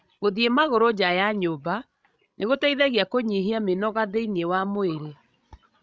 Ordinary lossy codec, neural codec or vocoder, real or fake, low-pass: none; codec, 16 kHz, 16 kbps, FreqCodec, larger model; fake; none